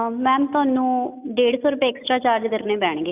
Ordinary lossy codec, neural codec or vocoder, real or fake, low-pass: none; none; real; 3.6 kHz